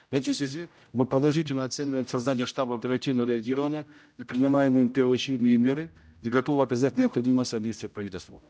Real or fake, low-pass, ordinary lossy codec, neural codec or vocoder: fake; none; none; codec, 16 kHz, 0.5 kbps, X-Codec, HuBERT features, trained on general audio